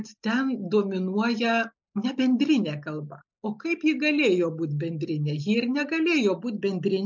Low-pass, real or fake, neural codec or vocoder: 7.2 kHz; real; none